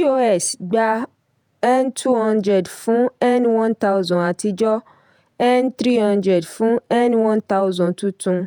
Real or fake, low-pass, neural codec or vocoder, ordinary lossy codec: fake; 19.8 kHz; vocoder, 48 kHz, 128 mel bands, Vocos; none